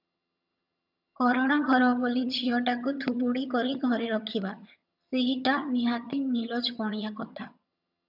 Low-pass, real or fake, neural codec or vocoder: 5.4 kHz; fake; vocoder, 22.05 kHz, 80 mel bands, HiFi-GAN